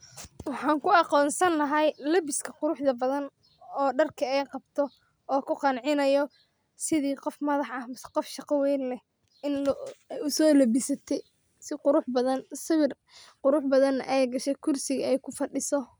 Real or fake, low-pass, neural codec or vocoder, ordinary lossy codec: real; none; none; none